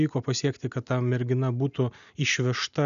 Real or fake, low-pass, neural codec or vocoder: real; 7.2 kHz; none